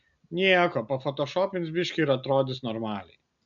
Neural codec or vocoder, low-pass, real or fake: none; 7.2 kHz; real